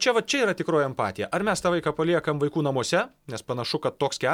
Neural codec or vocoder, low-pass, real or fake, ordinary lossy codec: none; 19.8 kHz; real; MP3, 96 kbps